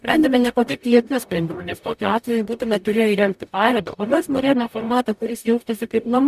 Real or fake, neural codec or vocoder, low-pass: fake; codec, 44.1 kHz, 0.9 kbps, DAC; 14.4 kHz